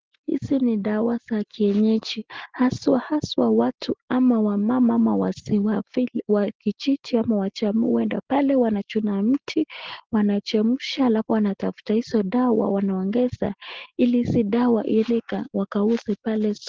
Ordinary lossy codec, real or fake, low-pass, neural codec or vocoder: Opus, 16 kbps; real; 7.2 kHz; none